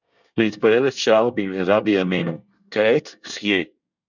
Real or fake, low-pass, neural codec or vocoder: fake; 7.2 kHz; codec, 24 kHz, 1 kbps, SNAC